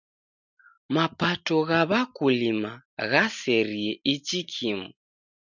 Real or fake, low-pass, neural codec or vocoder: real; 7.2 kHz; none